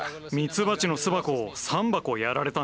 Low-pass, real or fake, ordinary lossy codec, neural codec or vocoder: none; real; none; none